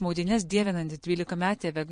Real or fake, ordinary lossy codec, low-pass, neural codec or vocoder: fake; MP3, 48 kbps; 9.9 kHz; vocoder, 22.05 kHz, 80 mel bands, Vocos